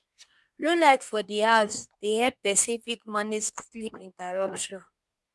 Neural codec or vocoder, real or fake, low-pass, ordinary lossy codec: codec, 24 kHz, 1 kbps, SNAC; fake; none; none